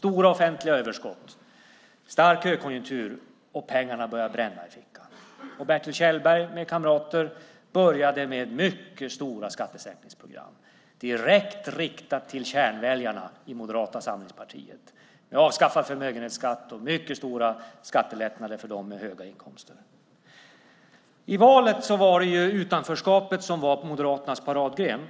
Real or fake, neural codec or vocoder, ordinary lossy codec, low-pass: real; none; none; none